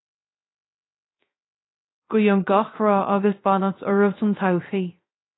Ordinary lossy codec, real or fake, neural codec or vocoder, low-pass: AAC, 16 kbps; fake; codec, 16 kHz, 0.3 kbps, FocalCodec; 7.2 kHz